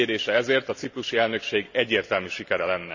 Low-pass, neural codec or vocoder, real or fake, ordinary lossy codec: 7.2 kHz; none; real; none